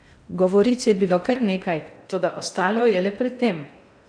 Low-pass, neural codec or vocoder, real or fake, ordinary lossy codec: 9.9 kHz; codec, 16 kHz in and 24 kHz out, 0.6 kbps, FocalCodec, streaming, 4096 codes; fake; none